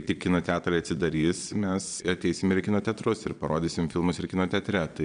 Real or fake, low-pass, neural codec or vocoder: fake; 9.9 kHz; vocoder, 22.05 kHz, 80 mel bands, Vocos